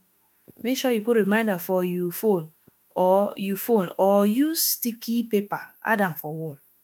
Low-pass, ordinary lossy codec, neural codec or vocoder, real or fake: none; none; autoencoder, 48 kHz, 32 numbers a frame, DAC-VAE, trained on Japanese speech; fake